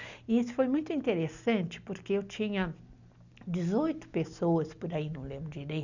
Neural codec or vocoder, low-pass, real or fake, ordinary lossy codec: none; 7.2 kHz; real; none